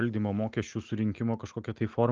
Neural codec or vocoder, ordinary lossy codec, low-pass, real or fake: none; Opus, 32 kbps; 7.2 kHz; real